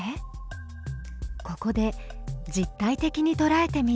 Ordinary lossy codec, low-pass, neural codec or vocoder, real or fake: none; none; none; real